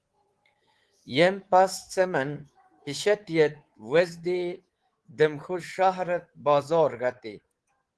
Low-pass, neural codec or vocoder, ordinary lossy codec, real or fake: 10.8 kHz; codec, 24 kHz, 3.1 kbps, DualCodec; Opus, 16 kbps; fake